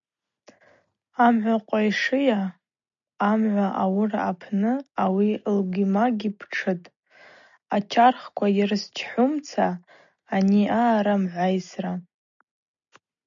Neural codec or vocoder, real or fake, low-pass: none; real; 7.2 kHz